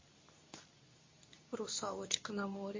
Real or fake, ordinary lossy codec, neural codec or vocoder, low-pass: fake; MP3, 32 kbps; codec, 24 kHz, 0.9 kbps, WavTokenizer, medium speech release version 2; 7.2 kHz